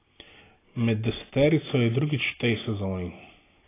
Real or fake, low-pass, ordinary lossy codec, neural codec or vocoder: real; 3.6 kHz; AAC, 16 kbps; none